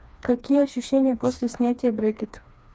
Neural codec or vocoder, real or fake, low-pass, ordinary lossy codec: codec, 16 kHz, 2 kbps, FreqCodec, smaller model; fake; none; none